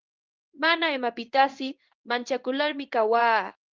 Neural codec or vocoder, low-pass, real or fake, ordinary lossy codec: codec, 16 kHz in and 24 kHz out, 1 kbps, XY-Tokenizer; 7.2 kHz; fake; Opus, 24 kbps